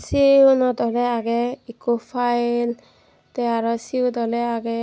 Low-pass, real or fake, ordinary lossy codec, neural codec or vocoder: none; real; none; none